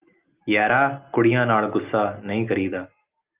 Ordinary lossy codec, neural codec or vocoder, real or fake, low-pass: Opus, 32 kbps; none; real; 3.6 kHz